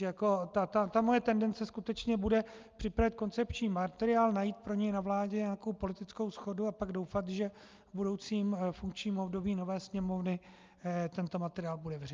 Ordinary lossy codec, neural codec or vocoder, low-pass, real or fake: Opus, 24 kbps; none; 7.2 kHz; real